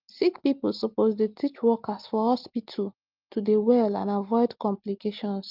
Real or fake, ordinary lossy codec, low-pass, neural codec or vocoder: real; Opus, 32 kbps; 5.4 kHz; none